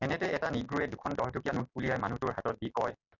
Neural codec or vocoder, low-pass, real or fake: none; 7.2 kHz; real